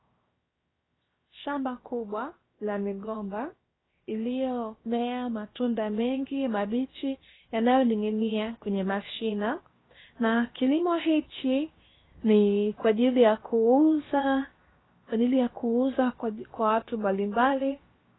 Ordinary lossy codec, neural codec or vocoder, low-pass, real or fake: AAC, 16 kbps; codec, 16 kHz, 0.7 kbps, FocalCodec; 7.2 kHz; fake